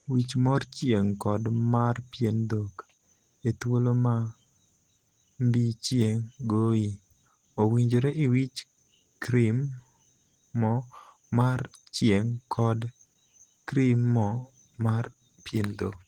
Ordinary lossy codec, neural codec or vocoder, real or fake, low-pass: Opus, 16 kbps; none; real; 19.8 kHz